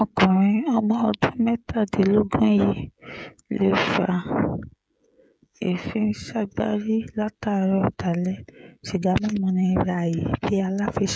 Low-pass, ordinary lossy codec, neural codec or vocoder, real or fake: none; none; codec, 16 kHz, 16 kbps, FreqCodec, smaller model; fake